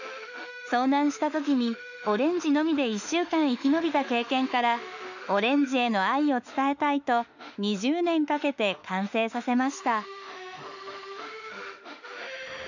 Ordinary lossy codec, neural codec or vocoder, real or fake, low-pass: none; autoencoder, 48 kHz, 32 numbers a frame, DAC-VAE, trained on Japanese speech; fake; 7.2 kHz